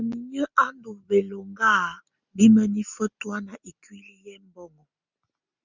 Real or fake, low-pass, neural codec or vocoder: real; 7.2 kHz; none